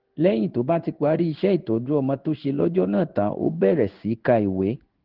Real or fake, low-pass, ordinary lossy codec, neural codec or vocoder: fake; 5.4 kHz; Opus, 16 kbps; codec, 16 kHz in and 24 kHz out, 1 kbps, XY-Tokenizer